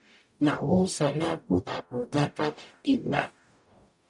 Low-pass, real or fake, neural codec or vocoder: 10.8 kHz; fake; codec, 44.1 kHz, 0.9 kbps, DAC